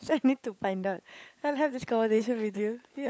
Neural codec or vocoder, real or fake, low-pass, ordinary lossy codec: codec, 16 kHz, 16 kbps, FunCodec, trained on LibriTTS, 50 frames a second; fake; none; none